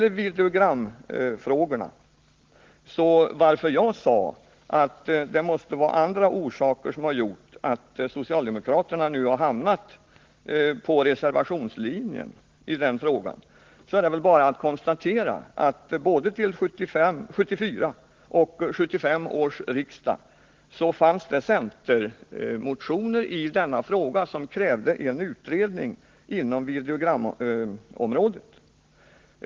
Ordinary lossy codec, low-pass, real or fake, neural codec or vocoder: Opus, 16 kbps; 7.2 kHz; real; none